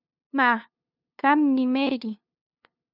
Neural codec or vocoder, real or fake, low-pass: codec, 16 kHz, 2 kbps, FunCodec, trained on LibriTTS, 25 frames a second; fake; 5.4 kHz